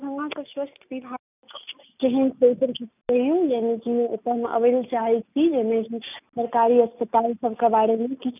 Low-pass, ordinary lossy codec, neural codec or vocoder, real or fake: 3.6 kHz; none; none; real